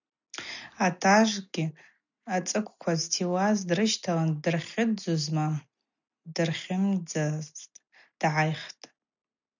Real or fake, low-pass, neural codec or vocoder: real; 7.2 kHz; none